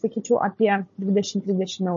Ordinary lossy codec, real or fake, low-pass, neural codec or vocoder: MP3, 32 kbps; fake; 7.2 kHz; codec, 16 kHz, 16 kbps, FunCodec, trained on Chinese and English, 50 frames a second